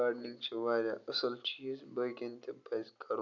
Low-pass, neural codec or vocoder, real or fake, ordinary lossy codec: 7.2 kHz; none; real; none